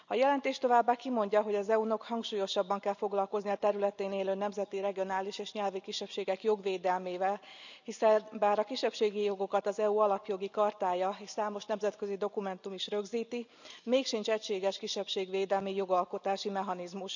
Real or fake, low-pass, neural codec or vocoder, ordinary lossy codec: real; 7.2 kHz; none; none